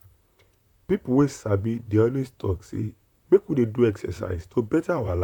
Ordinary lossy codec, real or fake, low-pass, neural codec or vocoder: none; fake; 19.8 kHz; vocoder, 44.1 kHz, 128 mel bands, Pupu-Vocoder